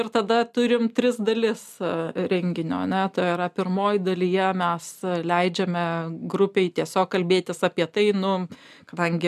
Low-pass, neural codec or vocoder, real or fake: 14.4 kHz; none; real